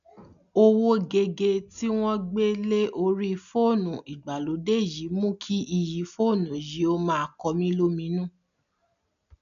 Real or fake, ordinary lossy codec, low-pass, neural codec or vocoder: real; none; 7.2 kHz; none